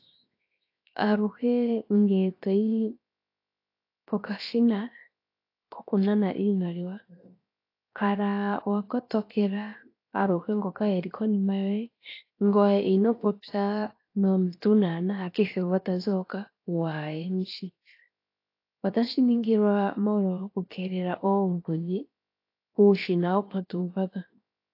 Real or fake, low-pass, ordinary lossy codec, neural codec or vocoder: fake; 5.4 kHz; AAC, 32 kbps; codec, 16 kHz, 0.7 kbps, FocalCodec